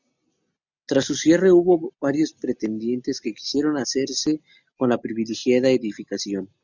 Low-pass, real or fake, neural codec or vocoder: 7.2 kHz; real; none